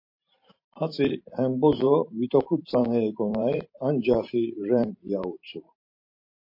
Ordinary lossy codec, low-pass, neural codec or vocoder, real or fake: MP3, 32 kbps; 5.4 kHz; vocoder, 44.1 kHz, 128 mel bands every 512 samples, BigVGAN v2; fake